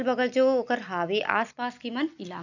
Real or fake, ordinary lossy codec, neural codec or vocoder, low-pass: real; none; none; 7.2 kHz